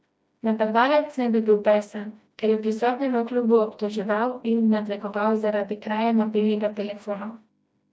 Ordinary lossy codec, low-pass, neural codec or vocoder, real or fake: none; none; codec, 16 kHz, 1 kbps, FreqCodec, smaller model; fake